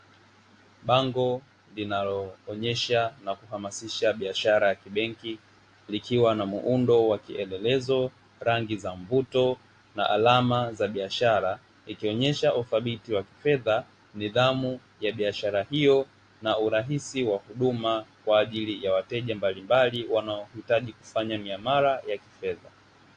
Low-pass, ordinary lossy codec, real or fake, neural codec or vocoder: 9.9 kHz; AAC, 48 kbps; real; none